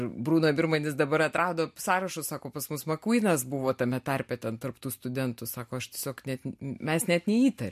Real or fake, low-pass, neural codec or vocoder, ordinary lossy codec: real; 14.4 kHz; none; MP3, 64 kbps